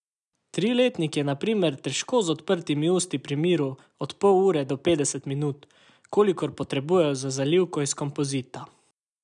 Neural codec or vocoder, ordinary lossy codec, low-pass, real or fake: none; none; 10.8 kHz; real